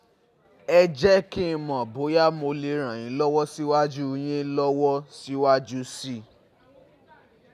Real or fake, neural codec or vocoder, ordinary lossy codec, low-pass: real; none; none; 14.4 kHz